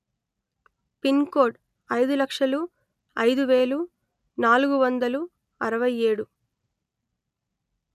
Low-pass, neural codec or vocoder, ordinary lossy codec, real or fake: 14.4 kHz; none; none; real